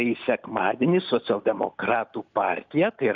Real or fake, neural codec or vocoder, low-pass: real; none; 7.2 kHz